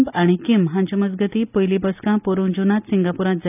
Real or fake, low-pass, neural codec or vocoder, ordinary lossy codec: real; 3.6 kHz; none; none